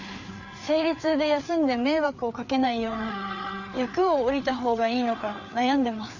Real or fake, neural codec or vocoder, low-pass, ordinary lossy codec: fake; codec, 16 kHz, 4 kbps, FreqCodec, larger model; 7.2 kHz; none